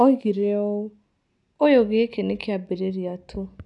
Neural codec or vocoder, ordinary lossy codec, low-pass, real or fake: none; none; 10.8 kHz; real